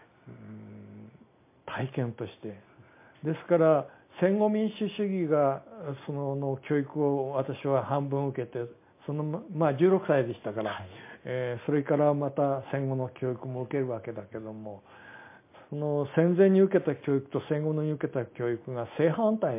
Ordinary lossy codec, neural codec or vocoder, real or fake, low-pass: MP3, 24 kbps; none; real; 3.6 kHz